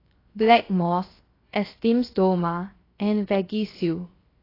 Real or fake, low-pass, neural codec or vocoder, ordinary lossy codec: fake; 5.4 kHz; codec, 16 kHz, 0.7 kbps, FocalCodec; AAC, 24 kbps